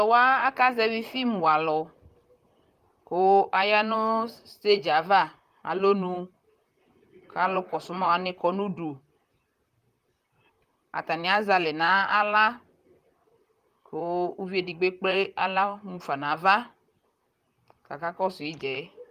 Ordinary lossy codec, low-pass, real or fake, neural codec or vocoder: Opus, 32 kbps; 14.4 kHz; fake; vocoder, 44.1 kHz, 128 mel bands, Pupu-Vocoder